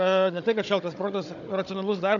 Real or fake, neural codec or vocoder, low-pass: fake; codec, 16 kHz, 16 kbps, FunCodec, trained on Chinese and English, 50 frames a second; 7.2 kHz